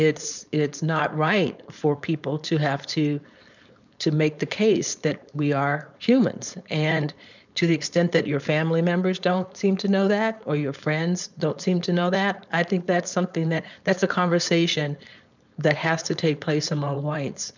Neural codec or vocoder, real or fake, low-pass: codec, 16 kHz, 4.8 kbps, FACodec; fake; 7.2 kHz